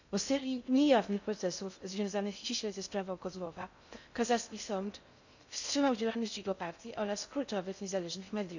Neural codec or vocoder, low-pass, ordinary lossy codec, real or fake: codec, 16 kHz in and 24 kHz out, 0.6 kbps, FocalCodec, streaming, 2048 codes; 7.2 kHz; none; fake